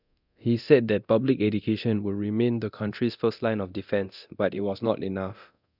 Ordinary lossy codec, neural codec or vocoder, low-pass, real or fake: none; codec, 24 kHz, 0.9 kbps, DualCodec; 5.4 kHz; fake